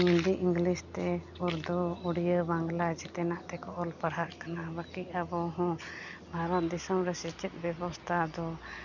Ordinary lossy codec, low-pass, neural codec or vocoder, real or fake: none; 7.2 kHz; none; real